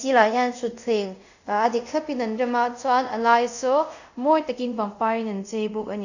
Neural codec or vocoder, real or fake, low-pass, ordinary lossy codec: codec, 24 kHz, 0.5 kbps, DualCodec; fake; 7.2 kHz; none